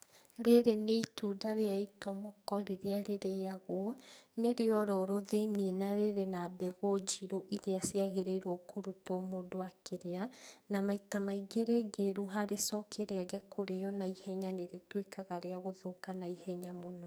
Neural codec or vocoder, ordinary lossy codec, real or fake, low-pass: codec, 44.1 kHz, 2.6 kbps, SNAC; none; fake; none